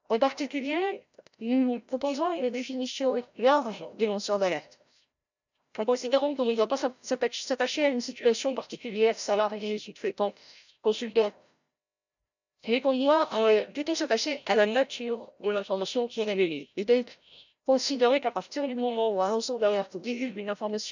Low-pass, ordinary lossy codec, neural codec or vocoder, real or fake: 7.2 kHz; none; codec, 16 kHz, 0.5 kbps, FreqCodec, larger model; fake